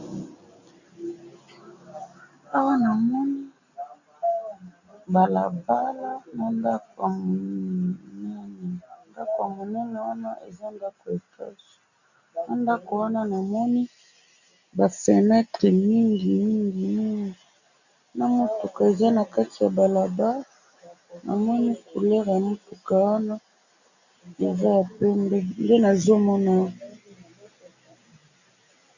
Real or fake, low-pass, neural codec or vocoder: real; 7.2 kHz; none